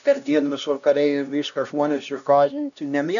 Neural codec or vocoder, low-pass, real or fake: codec, 16 kHz, 1 kbps, X-Codec, HuBERT features, trained on LibriSpeech; 7.2 kHz; fake